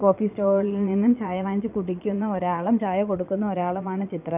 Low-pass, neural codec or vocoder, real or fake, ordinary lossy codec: 3.6 kHz; vocoder, 22.05 kHz, 80 mel bands, Vocos; fake; none